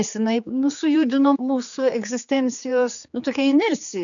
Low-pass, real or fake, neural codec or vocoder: 7.2 kHz; fake; codec, 16 kHz, 4 kbps, X-Codec, HuBERT features, trained on general audio